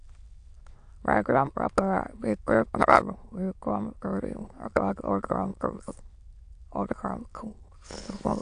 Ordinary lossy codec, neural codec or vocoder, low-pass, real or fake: AAC, 64 kbps; autoencoder, 22.05 kHz, a latent of 192 numbers a frame, VITS, trained on many speakers; 9.9 kHz; fake